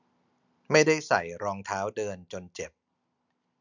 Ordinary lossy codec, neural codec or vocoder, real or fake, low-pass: none; none; real; 7.2 kHz